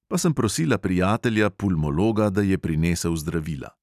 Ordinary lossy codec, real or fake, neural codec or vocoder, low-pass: none; real; none; 14.4 kHz